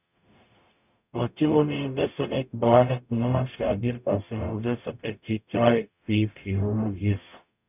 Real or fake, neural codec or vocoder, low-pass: fake; codec, 44.1 kHz, 0.9 kbps, DAC; 3.6 kHz